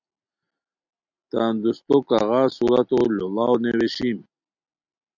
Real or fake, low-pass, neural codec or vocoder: real; 7.2 kHz; none